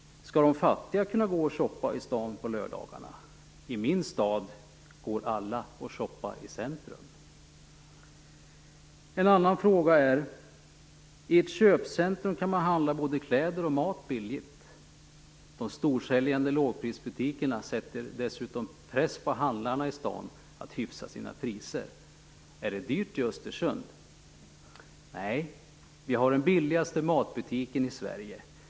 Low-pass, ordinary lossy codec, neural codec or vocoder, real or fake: none; none; none; real